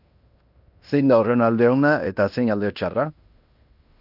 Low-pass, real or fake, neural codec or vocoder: 5.4 kHz; fake; codec, 16 kHz in and 24 kHz out, 0.9 kbps, LongCat-Audio-Codec, fine tuned four codebook decoder